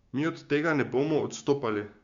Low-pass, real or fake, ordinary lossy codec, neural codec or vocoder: 7.2 kHz; fake; Opus, 64 kbps; codec, 16 kHz, 6 kbps, DAC